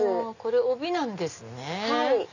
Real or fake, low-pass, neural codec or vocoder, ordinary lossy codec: real; 7.2 kHz; none; none